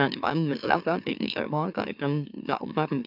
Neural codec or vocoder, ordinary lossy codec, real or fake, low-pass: autoencoder, 44.1 kHz, a latent of 192 numbers a frame, MeloTTS; none; fake; 5.4 kHz